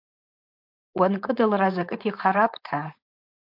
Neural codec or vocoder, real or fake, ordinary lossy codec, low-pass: codec, 16 kHz, 4.8 kbps, FACodec; fake; MP3, 48 kbps; 5.4 kHz